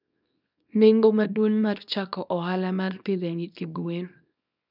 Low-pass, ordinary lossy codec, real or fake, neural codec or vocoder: 5.4 kHz; none; fake; codec, 24 kHz, 0.9 kbps, WavTokenizer, small release